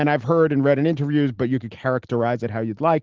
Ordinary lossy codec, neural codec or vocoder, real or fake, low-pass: Opus, 16 kbps; none; real; 7.2 kHz